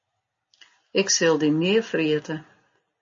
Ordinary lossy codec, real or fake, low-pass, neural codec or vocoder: MP3, 32 kbps; real; 7.2 kHz; none